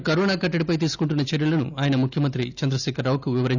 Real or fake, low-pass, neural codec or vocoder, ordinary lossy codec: real; 7.2 kHz; none; none